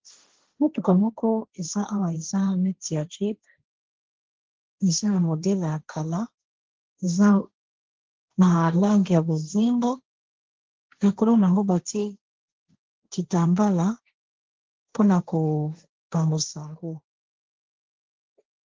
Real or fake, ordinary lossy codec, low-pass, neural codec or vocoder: fake; Opus, 16 kbps; 7.2 kHz; codec, 16 kHz, 1.1 kbps, Voila-Tokenizer